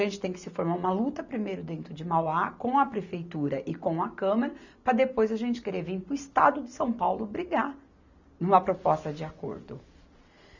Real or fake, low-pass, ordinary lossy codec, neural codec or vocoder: real; 7.2 kHz; none; none